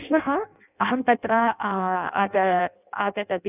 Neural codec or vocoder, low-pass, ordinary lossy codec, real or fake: codec, 16 kHz in and 24 kHz out, 0.6 kbps, FireRedTTS-2 codec; 3.6 kHz; none; fake